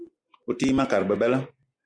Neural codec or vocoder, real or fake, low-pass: none; real; 9.9 kHz